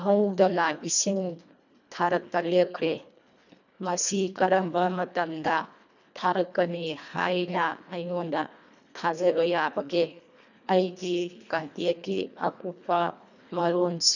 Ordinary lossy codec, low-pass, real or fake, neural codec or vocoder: none; 7.2 kHz; fake; codec, 24 kHz, 1.5 kbps, HILCodec